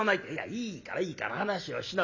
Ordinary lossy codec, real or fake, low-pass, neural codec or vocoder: none; real; 7.2 kHz; none